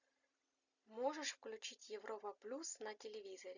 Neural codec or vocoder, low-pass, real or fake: none; 7.2 kHz; real